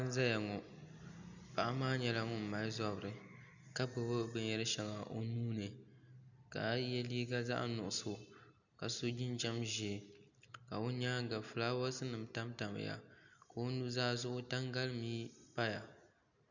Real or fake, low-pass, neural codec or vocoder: real; 7.2 kHz; none